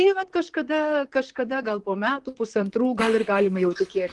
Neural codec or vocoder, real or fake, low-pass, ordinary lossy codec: vocoder, 44.1 kHz, 128 mel bands, Pupu-Vocoder; fake; 10.8 kHz; Opus, 24 kbps